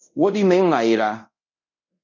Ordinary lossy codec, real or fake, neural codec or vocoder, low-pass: MP3, 64 kbps; fake; codec, 24 kHz, 0.5 kbps, DualCodec; 7.2 kHz